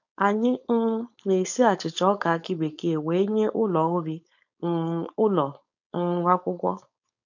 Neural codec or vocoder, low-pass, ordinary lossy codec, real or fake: codec, 16 kHz, 4.8 kbps, FACodec; 7.2 kHz; none; fake